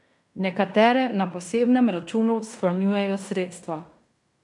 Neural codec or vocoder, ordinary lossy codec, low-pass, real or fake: codec, 16 kHz in and 24 kHz out, 0.9 kbps, LongCat-Audio-Codec, fine tuned four codebook decoder; none; 10.8 kHz; fake